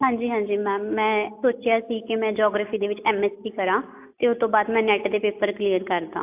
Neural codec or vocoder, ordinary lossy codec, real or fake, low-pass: none; AAC, 32 kbps; real; 3.6 kHz